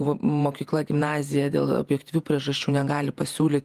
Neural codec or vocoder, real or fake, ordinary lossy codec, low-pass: vocoder, 48 kHz, 128 mel bands, Vocos; fake; Opus, 32 kbps; 14.4 kHz